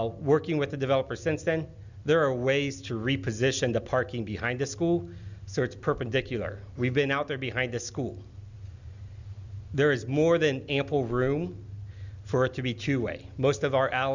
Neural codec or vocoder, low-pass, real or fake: none; 7.2 kHz; real